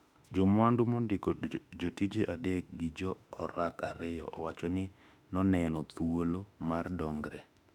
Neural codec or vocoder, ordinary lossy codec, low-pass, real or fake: autoencoder, 48 kHz, 32 numbers a frame, DAC-VAE, trained on Japanese speech; none; 19.8 kHz; fake